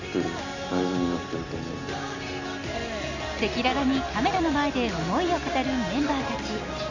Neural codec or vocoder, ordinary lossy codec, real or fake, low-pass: none; none; real; 7.2 kHz